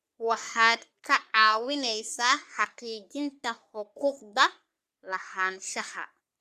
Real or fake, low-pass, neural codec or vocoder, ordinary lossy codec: fake; 14.4 kHz; codec, 44.1 kHz, 3.4 kbps, Pupu-Codec; Opus, 64 kbps